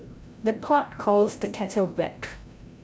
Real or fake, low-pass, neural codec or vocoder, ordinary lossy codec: fake; none; codec, 16 kHz, 0.5 kbps, FreqCodec, larger model; none